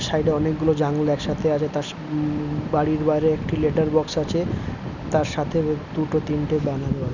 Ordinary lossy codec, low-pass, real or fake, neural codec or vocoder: none; 7.2 kHz; real; none